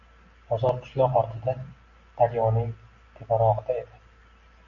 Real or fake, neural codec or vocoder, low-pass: real; none; 7.2 kHz